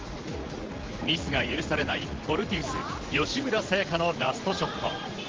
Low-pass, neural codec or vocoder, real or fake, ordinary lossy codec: 7.2 kHz; vocoder, 44.1 kHz, 80 mel bands, Vocos; fake; Opus, 16 kbps